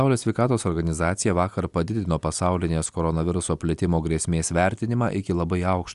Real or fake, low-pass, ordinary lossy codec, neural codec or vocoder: real; 10.8 kHz; Opus, 64 kbps; none